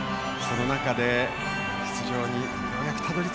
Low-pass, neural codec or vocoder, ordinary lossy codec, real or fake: none; none; none; real